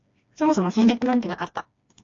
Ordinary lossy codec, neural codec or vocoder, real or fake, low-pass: AAC, 48 kbps; codec, 16 kHz, 2 kbps, FreqCodec, smaller model; fake; 7.2 kHz